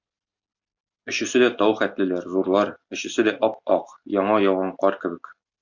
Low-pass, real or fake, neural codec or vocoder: 7.2 kHz; real; none